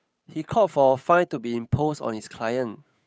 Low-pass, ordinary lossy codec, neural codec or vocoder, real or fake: none; none; codec, 16 kHz, 8 kbps, FunCodec, trained on Chinese and English, 25 frames a second; fake